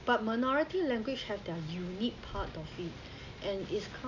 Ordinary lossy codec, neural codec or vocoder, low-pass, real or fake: none; none; 7.2 kHz; real